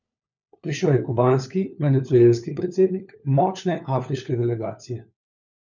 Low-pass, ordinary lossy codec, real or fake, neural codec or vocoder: 7.2 kHz; none; fake; codec, 16 kHz, 4 kbps, FunCodec, trained on LibriTTS, 50 frames a second